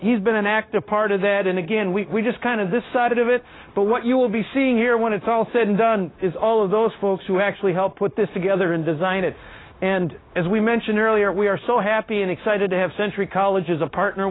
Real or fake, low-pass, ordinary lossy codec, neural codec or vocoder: fake; 7.2 kHz; AAC, 16 kbps; codec, 16 kHz, 0.9 kbps, LongCat-Audio-Codec